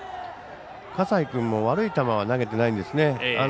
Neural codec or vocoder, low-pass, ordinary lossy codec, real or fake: none; none; none; real